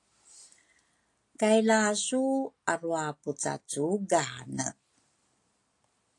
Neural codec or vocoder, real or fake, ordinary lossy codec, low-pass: none; real; AAC, 48 kbps; 10.8 kHz